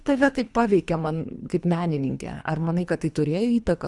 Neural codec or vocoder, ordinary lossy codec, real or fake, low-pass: codec, 24 kHz, 3 kbps, HILCodec; Opus, 64 kbps; fake; 10.8 kHz